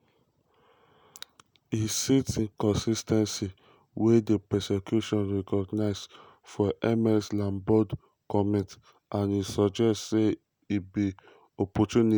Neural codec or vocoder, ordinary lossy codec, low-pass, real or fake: none; none; none; real